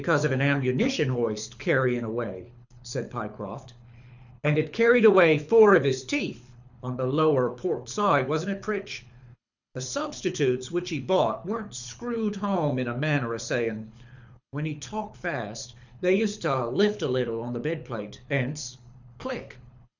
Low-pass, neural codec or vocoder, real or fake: 7.2 kHz; codec, 24 kHz, 6 kbps, HILCodec; fake